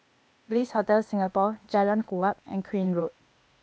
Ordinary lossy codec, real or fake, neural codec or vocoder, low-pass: none; fake; codec, 16 kHz, 0.8 kbps, ZipCodec; none